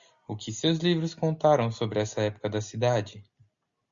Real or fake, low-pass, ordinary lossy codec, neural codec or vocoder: real; 7.2 kHz; Opus, 64 kbps; none